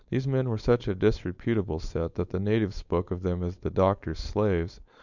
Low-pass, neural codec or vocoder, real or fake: 7.2 kHz; codec, 16 kHz, 4.8 kbps, FACodec; fake